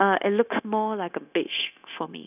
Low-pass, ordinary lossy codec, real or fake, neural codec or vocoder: 3.6 kHz; none; fake; codec, 16 kHz, 0.9 kbps, LongCat-Audio-Codec